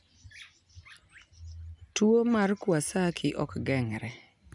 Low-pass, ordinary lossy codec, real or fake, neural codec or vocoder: 10.8 kHz; none; real; none